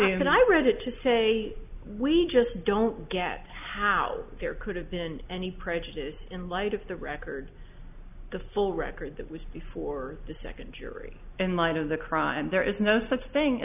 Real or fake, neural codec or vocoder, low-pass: real; none; 3.6 kHz